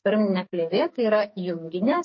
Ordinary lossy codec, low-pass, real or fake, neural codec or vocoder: MP3, 32 kbps; 7.2 kHz; fake; codec, 44.1 kHz, 2.6 kbps, SNAC